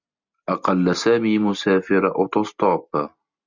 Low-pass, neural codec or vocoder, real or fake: 7.2 kHz; none; real